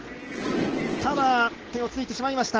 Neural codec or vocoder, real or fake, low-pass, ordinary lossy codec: none; real; 7.2 kHz; Opus, 16 kbps